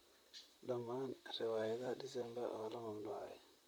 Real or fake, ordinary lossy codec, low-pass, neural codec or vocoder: fake; none; none; vocoder, 44.1 kHz, 128 mel bands, Pupu-Vocoder